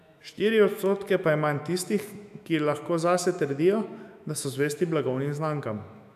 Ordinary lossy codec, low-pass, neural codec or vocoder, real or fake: none; 14.4 kHz; autoencoder, 48 kHz, 128 numbers a frame, DAC-VAE, trained on Japanese speech; fake